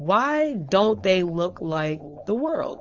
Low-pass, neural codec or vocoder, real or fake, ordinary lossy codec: 7.2 kHz; codec, 16 kHz, 4.8 kbps, FACodec; fake; Opus, 32 kbps